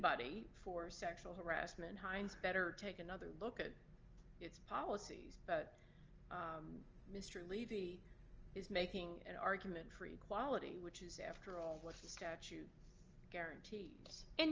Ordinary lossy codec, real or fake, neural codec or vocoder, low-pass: Opus, 24 kbps; real; none; 7.2 kHz